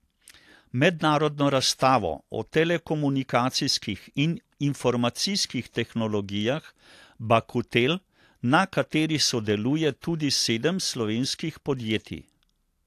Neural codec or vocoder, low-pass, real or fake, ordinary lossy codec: none; 14.4 kHz; real; AAC, 64 kbps